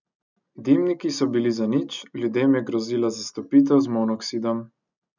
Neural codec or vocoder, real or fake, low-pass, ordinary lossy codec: none; real; 7.2 kHz; none